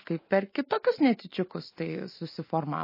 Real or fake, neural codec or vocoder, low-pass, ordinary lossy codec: real; none; 5.4 kHz; MP3, 24 kbps